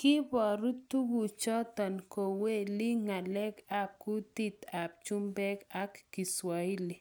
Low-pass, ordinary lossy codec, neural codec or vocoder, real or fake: none; none; none; real